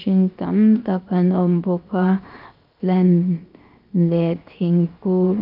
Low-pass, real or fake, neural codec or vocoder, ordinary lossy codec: 5.4 kHz; fake; codec, 16 kHz, 0.7 kbps, FocalCodec; Opus, 24 kbps